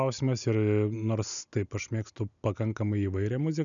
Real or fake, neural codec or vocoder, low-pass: real; none; 7.2 kHz